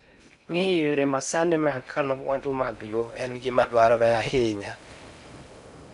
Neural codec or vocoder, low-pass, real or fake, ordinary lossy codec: codec, 16 kHz in and 24 kHz out, 0.6 kbps, FocalCodec, streaming, 4096 codes; 10.8 kHz; fake; none